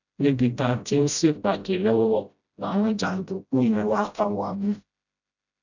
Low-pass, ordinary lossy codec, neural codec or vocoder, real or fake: 7.2 kHz; none; codec, 16 kHz, 0.5 kbps, FreqCodec, smaller model; fake